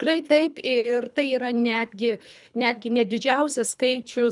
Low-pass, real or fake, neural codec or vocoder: 10.8 kHz; fake; codec, 24 kHz, 3 kbps, HILCodec